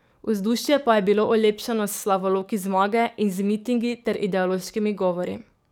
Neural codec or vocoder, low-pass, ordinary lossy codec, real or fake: codec, 44.1 kHz, 7.8 kbps, DAC; 19.8 kHz; none; fake